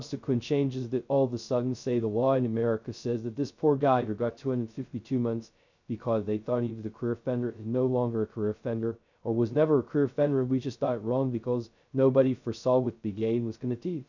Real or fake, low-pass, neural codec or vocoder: fake; 7.2 kHz; codec, 16 kHz, 0.2 kbps, FocalCodec